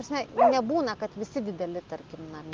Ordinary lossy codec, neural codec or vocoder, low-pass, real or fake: Opus, 32 kbps; none; 7.2 kHz; real